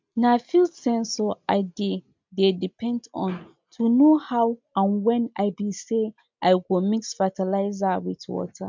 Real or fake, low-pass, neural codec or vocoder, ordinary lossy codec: real; 7.2 kHz; none; MP3, 64 kbps